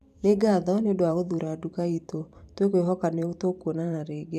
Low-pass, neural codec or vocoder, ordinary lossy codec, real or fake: 14.4 kHz; vocoder, 44.1 kHz, 128 mel bands every 512 samples, BigVGAN v2; none; fake